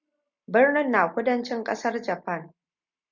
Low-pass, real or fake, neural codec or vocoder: 7.2 kHz; real; none